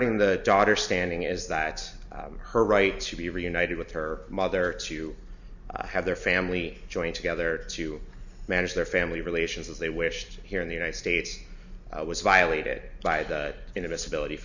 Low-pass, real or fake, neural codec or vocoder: 7.2 kHz; real; none